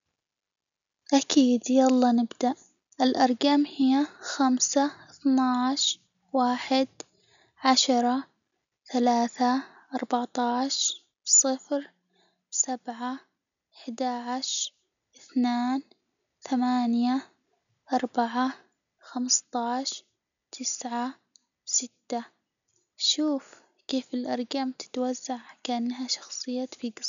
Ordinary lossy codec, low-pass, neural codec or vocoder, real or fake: none; 7.2 kHz; none; real